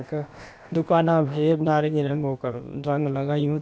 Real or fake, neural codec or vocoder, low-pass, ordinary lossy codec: fake; codec, 16 kHz, about 1 kbps, DyCAST, with the encoder's durations; none; none